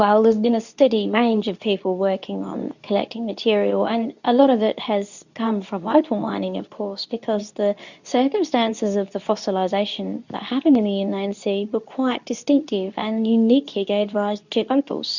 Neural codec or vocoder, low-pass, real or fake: codec, 24 kHz, 0.9 kbps, WavTokenizer, medium speech release version 2; 7.2 kHz; fake